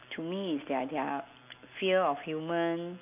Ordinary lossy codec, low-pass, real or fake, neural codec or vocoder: none; 3.6 kHz; real; none